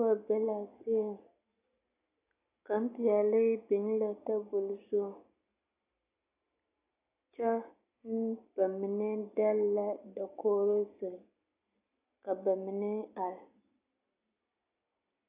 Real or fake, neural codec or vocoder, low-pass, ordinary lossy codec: real; none; 3.6 kHz; AAC, 24 kbps